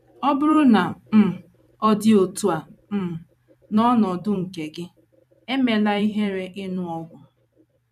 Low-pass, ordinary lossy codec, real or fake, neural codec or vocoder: 14.4 kHz; none; fake; vocoder, 44.1 kHz, 128 mel bands every 256 samples, BigVGAN v2